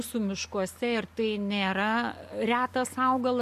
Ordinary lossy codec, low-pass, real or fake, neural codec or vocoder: MP3, 64 kbps; 14.4 kHz; real; none